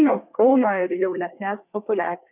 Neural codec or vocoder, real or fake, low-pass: codec, 24 kHz, 1 kbps, SNAC; fake; 3.6 kHz